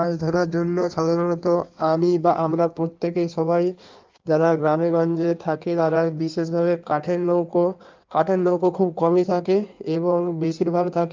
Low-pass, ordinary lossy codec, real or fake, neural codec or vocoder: 7.2 kHz; Opus, 24 kbps; fake; codec, 16 kHz in and 24 kHz out, 1.1 kbps, FireRedTTS-2 codec